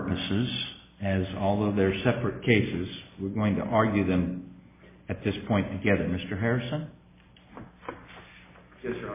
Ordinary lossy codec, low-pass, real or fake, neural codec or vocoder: MP3, 16 kbps; 3.6 kHz; real; none